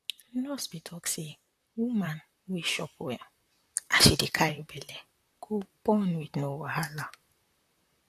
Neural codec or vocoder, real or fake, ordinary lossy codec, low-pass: vocoder, 44.1 kHz, 128 mel bands, Pupu-Vocoder; fake; AAC, 64 kbps; 14.4 kHz